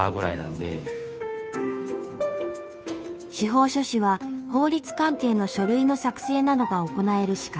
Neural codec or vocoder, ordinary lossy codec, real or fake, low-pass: codec, 16 kHz, 2 kbps, FunCodec, trained on Chinese and English, 25 frames a second; none; fake; none